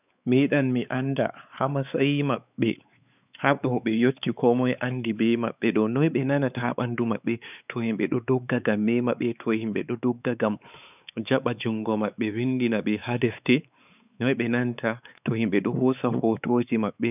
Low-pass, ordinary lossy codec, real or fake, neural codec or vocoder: 3.6 kHz; none; fake; codec, 16 kHz, 4 kbps, X-Codec, WavLM features, trained on Multilingual LibriSpeech